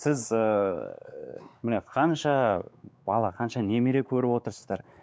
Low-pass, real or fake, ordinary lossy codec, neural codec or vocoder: none; fake; none; codec, 16 kHz, 4 kbps, X-Codec, WavLM features, trained on Multilingual LibriSpeech